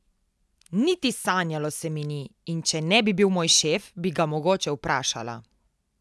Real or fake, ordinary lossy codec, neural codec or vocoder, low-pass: real; none; none; none